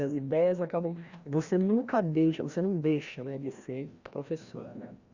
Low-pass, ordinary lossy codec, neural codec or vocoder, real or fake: 7.2 kHz; Opus, 64 kbps; codec, 16 kHz, 1 kbps, FreqCodec, larger model; fake